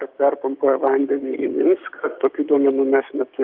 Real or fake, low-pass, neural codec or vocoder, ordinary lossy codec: fake; 5.4 kHz; codec, 16 kHz, 6 kbps, DAC; Opus, 32 kbps